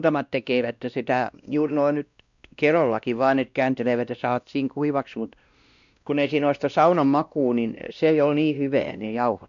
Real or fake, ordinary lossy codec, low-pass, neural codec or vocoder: fake; none; 7.2 kHz; codec, 16 kHz, 1 kbps, X-Codec, WavLM features, trained on Multilingual LibriSpeech